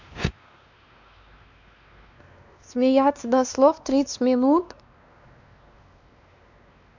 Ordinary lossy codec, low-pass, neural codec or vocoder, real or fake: none; 7.2 kHz; codec, 16 kHz, 2 kbps, X-Codec, WavLM features, trained on Multilingual LibriSpeech; fake